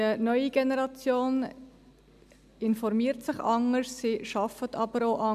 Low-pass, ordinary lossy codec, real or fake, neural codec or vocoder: 14.4 kHz; none; real; none